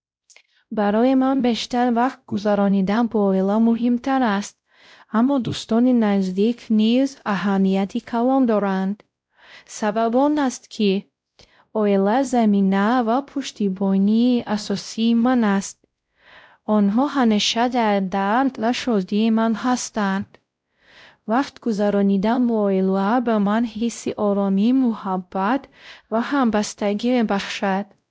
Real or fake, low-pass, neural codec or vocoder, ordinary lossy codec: fake; none; codec, 16 kHz, 0.5 kbps, X-Codec, WavLM features, trained on Multilingual LibriSpeech; none